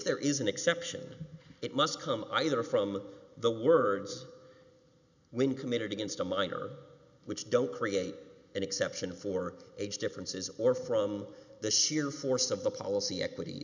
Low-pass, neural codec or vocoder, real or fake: 7.2 kHz; none; real